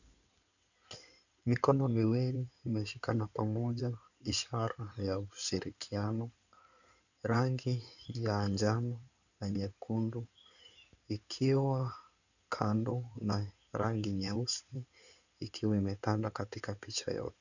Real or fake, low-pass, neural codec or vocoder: fake; 7.2 kHz; codec, 16 kHz in and 24 kHz out, 2.2 kbps, FireRedTTS-2 codec